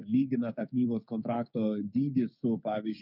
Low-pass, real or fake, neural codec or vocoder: 5.4 kHz; fake; codec, 16 kHz, 6 kbps, DAC